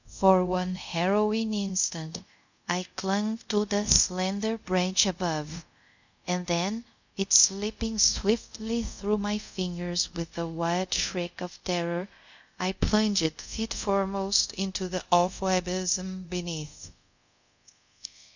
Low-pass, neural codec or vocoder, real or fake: 7.2 kHz; codec, 24 kHz, 0.5 kbps, DualCodec; fake